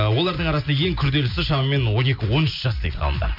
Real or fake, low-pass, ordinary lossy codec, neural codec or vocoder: real; 5.4 kHz; MP3, 48 kbps; none